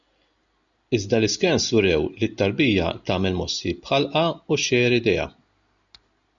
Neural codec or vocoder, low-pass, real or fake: none; 7.2 kHz; real